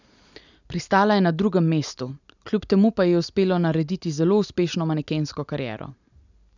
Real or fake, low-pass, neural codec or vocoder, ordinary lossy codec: real; 7.2 kHz; none; none